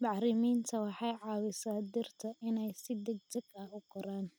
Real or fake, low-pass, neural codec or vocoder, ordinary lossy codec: real; none; none; none